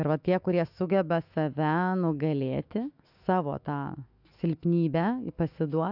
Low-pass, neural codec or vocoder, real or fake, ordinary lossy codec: 5.4 kHz; autoencoder, 48 kHz, 128 numbers a frame, DAC-VAE, trained on Japanese speech; fake; AAC, 48 kbps